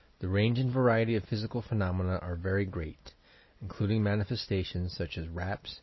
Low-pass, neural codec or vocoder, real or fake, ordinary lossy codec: 7.2 kHz; none; real; MP3, 24 kbps